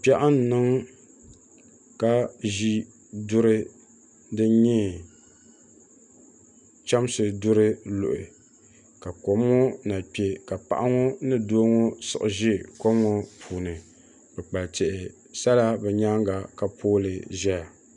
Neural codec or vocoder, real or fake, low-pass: none; real; 10.8 kHz